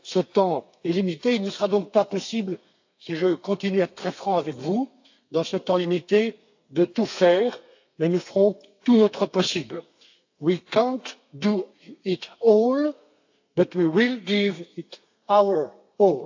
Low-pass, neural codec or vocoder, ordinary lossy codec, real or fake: 7.2 kHz; codec, 32 kHz, 1.9 kbps, SNAC; AAC, 48 kbps; fake